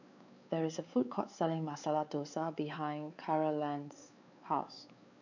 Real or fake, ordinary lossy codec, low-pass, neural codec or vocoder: fake; none; 7.2 kHz; codec, 16 kHz, 4 kbps, X-Codec, WavLM features, trained on Multilingual LibriSpeech